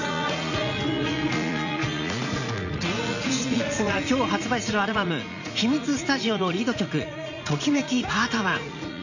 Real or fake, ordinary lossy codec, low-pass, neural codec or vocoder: fake; none; 7.2 kHz; vocoder, 44.1 kHz, 80 mel bands, Vocos